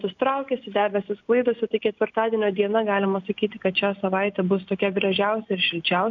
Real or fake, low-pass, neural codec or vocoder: real; 7.2 kHz; none